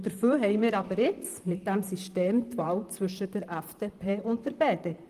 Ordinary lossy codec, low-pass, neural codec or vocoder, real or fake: Opus, 24 kbps; 14.4 kHz; vocoder, 44.1 kHz, 128 mel bands, Pupu-Vocoder; fake